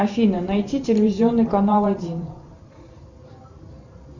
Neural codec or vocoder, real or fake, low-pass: vocoder, 44.1 kHz, 128 mel bands every 512 samples, BigVGAN v2; fake; 7.2 kHz